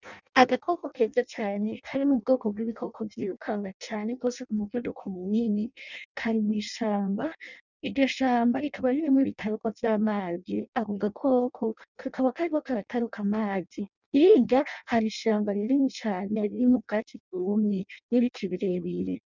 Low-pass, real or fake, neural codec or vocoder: 7.2 kHz; fake; codec, 16 kHz in and 24 kHz out, 0.6 kbps, FireRedTTS-2 codec